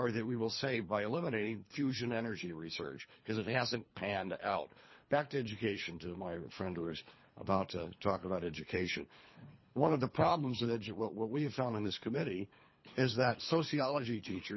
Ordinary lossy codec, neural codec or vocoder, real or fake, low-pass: MP3, 24 kbps; codec, 24 kHz, 3 kbps, HILCodec; fake; 7.2 kHz